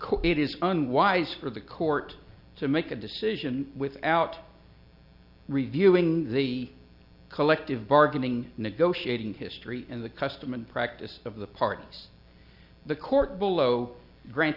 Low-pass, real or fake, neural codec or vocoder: 5.4 kHz; real; none